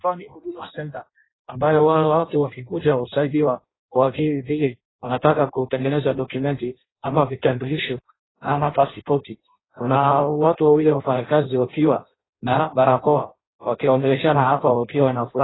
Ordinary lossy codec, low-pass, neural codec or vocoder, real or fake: AAC, 16 kbps; 7.2 kHz; codec, 16 kHz in and 24 kHz out, 0.6 kbps, FireRedTTS-2 codec; fake